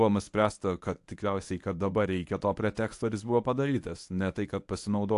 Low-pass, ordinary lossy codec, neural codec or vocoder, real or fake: 10.8 kHz; AAC, 64 kbps; codec, 24 kHz, 0.9 kbps, WavTokenizer, medium speech release version 1; fake